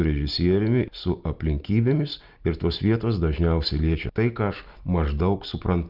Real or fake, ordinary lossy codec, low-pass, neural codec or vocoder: real; Opus, 32 kbps; 5.4 kHz; none